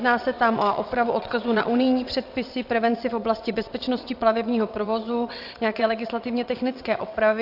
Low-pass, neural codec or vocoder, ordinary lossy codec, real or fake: 5.4 kHz; vocoder, 22.05 kHz, 80 mel bands, WaveNeXt; AAC, 48 kbps; fake